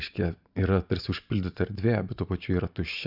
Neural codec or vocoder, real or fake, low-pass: vocoder, 44.1 kHz, 128 mel bands every 512 samples, BigVGAN v2; fake; 5.4 kHz